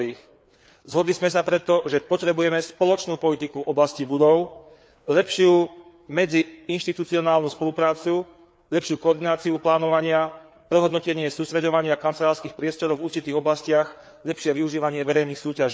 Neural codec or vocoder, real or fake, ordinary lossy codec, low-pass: codec, 16 kHz, 4 kbps, FreqCodec, larger model; fake; none; none